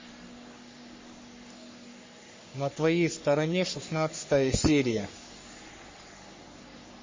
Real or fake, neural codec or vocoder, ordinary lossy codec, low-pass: fake; codec, 44.1 kHz, 3.4 kbps, Pupu-Codec; MP3, 32 kbps; 7.2 kHz